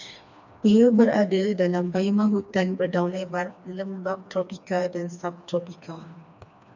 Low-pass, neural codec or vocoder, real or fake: 7.2 kHz; codec, 16 kHz, 2 kbps, FreqCodec, smaller model; fake